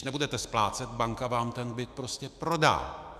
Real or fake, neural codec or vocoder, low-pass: fake; autoencoder, 48 kHz, 128 numbers a frame, DAC-VAE, trained on Japanese speech; 14.4 kHz